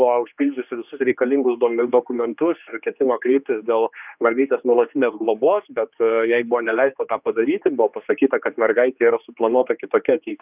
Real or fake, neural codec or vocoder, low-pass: fake; codec, 16 kHz, 2 kbps, X-Codec, HuBERT features, trained on general audio; 3.6 kHz